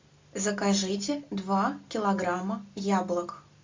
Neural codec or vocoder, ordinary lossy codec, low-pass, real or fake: none; MP3, 64 kbps; 7.2 kHz; real